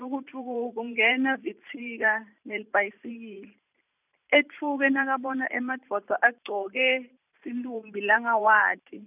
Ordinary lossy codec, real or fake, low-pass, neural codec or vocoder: none; fake; 3.6 kHz; vocoder, 44.1 kHz, 128 mel bands every 512 samples, BigVGAN v2